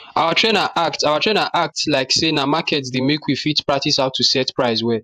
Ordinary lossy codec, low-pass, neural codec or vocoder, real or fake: AAC, 96 kbps; 14.4 kHz; vocoder, 48 kHz, 128 mel bands, Vocos; fake